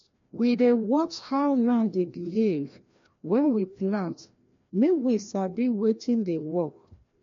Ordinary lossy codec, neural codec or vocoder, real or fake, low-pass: MP3, 48 kbps; codec, 16 kHz, 1 kbps, FreqCodec, larger model; fake; 7.2 kHz